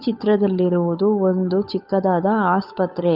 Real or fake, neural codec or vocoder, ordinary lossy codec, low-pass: fake; codec, 16 kHz, 8 kbps, FunCodec, trained on Chinese and English, 25 frames a second; none; 5.4 kHz